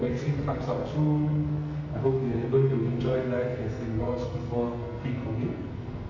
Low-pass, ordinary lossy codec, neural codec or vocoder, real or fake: 7.2 kHz; MP3, 48 kbps; codec, 44.1 kHz, 2.6 kbps, SNAC; fake